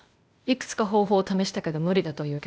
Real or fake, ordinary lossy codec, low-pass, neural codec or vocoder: fake; none; none; codec, 16 kHz, 0.8 kbps, ZipCodec